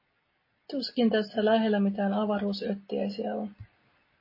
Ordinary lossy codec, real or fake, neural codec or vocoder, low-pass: MP3, 24 kbps; real; none; 5.4 kHz